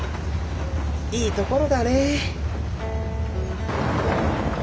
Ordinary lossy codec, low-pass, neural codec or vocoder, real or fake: none; none; none; real